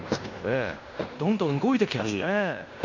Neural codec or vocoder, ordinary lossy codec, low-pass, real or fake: codec, 16 kHz in and 24 kHz out, 0.9 kbps, LongCat-Audio-Codec, fine tuned four codebook decoder; none; 7.2 kHz; fake